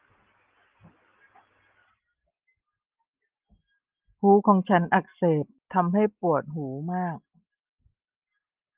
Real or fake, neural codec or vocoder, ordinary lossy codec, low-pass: real; none; Opus, 32 kbps; 3.6 kHz